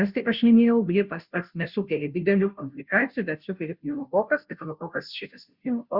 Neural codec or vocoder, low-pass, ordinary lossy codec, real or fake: codec, 16 kHz, 0.5 kbps, FunCodec, trained on Chinese and English, 25 frames a second; 5.4 kHz; Opus, 64 kbps; fake